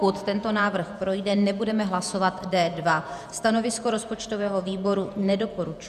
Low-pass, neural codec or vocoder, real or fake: 14.4 kHz; vocoder, 48 kHz, 128 mel bands, Vocos; fake